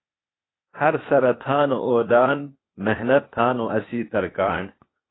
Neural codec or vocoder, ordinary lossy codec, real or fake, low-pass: codec, 16 kHz, 0.8 kbps, ZipCodec; AAC, 16 kbps; fake; 7.2 kHz